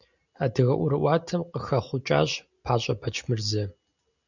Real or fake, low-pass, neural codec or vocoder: real; 7.2 kHz; none